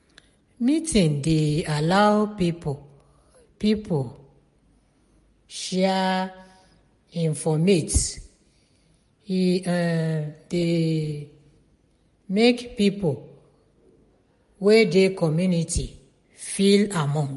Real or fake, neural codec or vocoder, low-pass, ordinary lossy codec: real; none; 14.4 kHz; MP3, 48 kbps